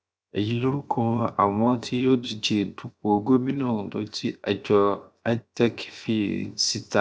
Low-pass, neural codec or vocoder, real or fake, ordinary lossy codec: none; codec, 16 kHz, 0.7 kbps, FocalCodec; fake; none